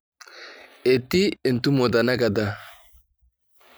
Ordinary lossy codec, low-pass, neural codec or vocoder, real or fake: none; none; none; real